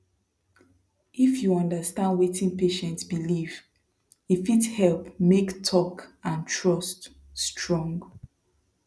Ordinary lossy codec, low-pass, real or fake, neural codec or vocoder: none; none; real; none